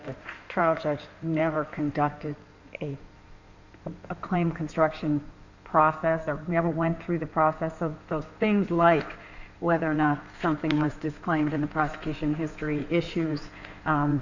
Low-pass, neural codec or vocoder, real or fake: 7.2 kHz; codec, 16 kHz in and 24 kHz out, 2.2 kbps, FireRedTTS-2 codec; fake